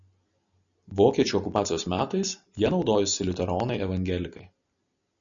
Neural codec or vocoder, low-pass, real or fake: none; 7.2 kHz; real